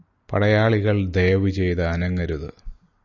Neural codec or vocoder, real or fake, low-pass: none; real; 7.2 kHz